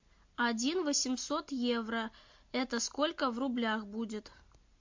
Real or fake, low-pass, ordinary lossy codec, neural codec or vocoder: real; 7.2 kHz; MP3, 48 kbps; none